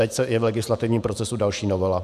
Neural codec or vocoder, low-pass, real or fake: vocoder, 48 kHz, 128 mel bands, Vocos; 14.4 kHz; fake